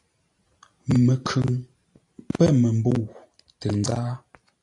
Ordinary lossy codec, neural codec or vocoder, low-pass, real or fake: AAC, 64 kbps; vocoder, 44.1 kHz, 128 mel bands every 512 samples, BigVGAN v2; 10.8 kHz; fake